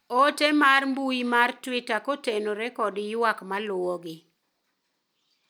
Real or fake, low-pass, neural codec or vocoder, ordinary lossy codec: real; none; none; none